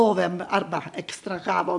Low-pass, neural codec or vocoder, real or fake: 10.8 kHz; none; real